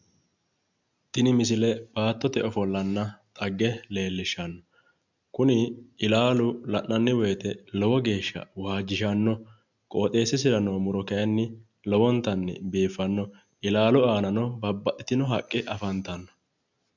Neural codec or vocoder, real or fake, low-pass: none; real; 7.2 kHz